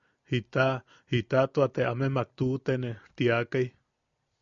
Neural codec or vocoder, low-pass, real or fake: none; 7.2 kHz; real